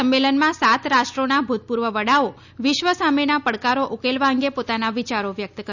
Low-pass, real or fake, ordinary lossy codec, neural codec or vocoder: 7.2 kHz; real; none; none